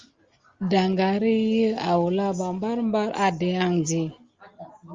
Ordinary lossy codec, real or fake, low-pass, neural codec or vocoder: Opus, 32 kbps; real; 7.2 kHz; none